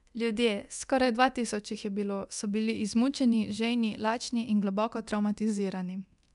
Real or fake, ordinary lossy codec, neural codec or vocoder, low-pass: fake; none; codec, 24 kHz, 0.9 kbps, DualCodec; 10.8 kHz